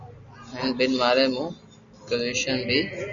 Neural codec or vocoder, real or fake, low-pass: none; real; 7.2 kHz